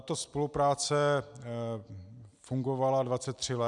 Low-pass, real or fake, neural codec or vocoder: 10.8 kHz; real; none